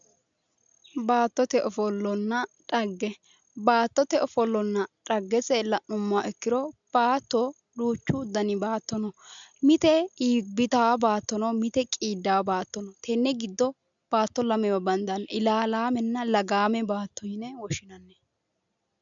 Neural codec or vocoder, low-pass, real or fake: none; 7.2 kHz; real